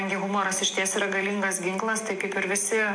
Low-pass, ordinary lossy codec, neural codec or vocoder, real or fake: 9.9 kHz; MP3, 64 kbps; none; real